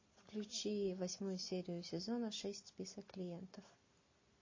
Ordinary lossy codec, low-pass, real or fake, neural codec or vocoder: MP3, 32 kbps; 7.2 kHz; real; none